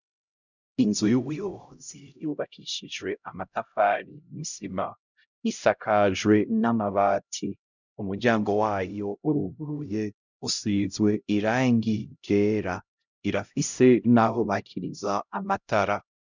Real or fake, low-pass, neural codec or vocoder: fake; 7.2 kHz; codec, 16 kHz, 0.5 kbps, X-Codec, HuBERT features, trained on LibriSpeech